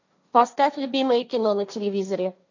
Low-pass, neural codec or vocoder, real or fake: 7.2 kHz; codec, 16 kHz, 1.1 kbps, Voila-Tokenizer; fake